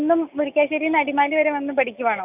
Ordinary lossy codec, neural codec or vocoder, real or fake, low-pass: none; none; real; 3.6 kHz